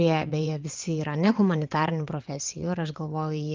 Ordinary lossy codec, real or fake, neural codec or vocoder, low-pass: Opus, 32 kbps; fake; vocoder, 22.05 kHz, 80 mel bands, WaveNeXt; 7.2 kHz